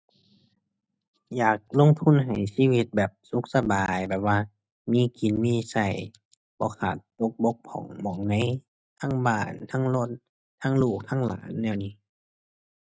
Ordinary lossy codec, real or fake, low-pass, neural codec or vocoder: none; real; none; none